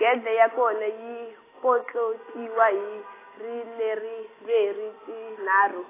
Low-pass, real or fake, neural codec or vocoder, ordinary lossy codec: 3.6 kHz; real; none; AAC, 16 kbps